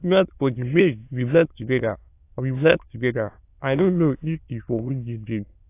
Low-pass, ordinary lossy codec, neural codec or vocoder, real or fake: 3.6 kHz; AAC, 24 kbps; autoencoder, 22.05 kHz, a latent of 192 numbers a frame, VITS, trained on many speakers; fake